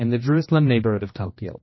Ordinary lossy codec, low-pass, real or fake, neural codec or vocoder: MP3, 24 kbps; 7.2 kHz; fake; codec, 16 kHz, 1 kbps, X-Codec, HuBERT features, trained on general audio